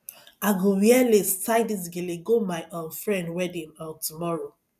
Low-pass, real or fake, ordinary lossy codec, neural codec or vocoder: 14.4 kHz; real; none; none